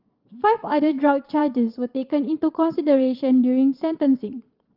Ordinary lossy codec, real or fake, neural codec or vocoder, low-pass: Opus, 32 kbps; fake; vocoder, 22.05 kHz, 80 mel bands, Vocos; 5.4 kHz